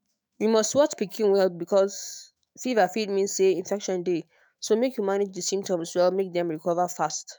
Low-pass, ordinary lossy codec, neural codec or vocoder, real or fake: none; none; autoencoder, 48 kHz, 128 numbers a frame, DAC-VAE, trained on Japanese speech; fake